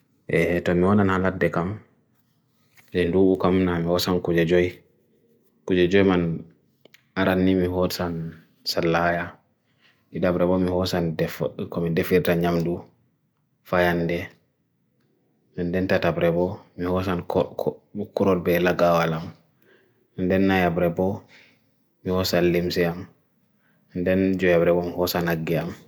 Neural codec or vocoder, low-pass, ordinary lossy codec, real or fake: none; none; none; real